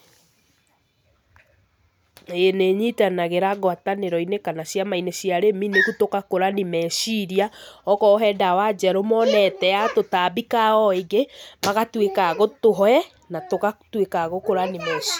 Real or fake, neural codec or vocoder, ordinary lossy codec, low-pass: real; none; none; none